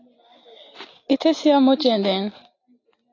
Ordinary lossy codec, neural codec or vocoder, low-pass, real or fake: AAC, 32 kbps; none; 7.2 kHz; real